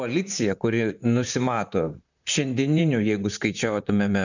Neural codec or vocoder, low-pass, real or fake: vocoder, 44.1 kHz, 128 mel bands, Pupu-Vocoder; 7.2 kHz; fake